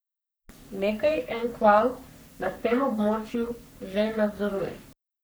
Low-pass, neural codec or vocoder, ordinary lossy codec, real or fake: none; codec, 44.1 kHz, 3.4 kbps, Pupu-Codec; none; fake